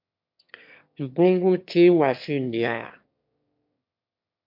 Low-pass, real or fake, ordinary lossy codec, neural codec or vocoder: 5.4 kHz; fake; MP3, 48 kbps; autoencoder, 22.05 kHz, a latent of 192 numbers a frame, VITS, trained on one speaker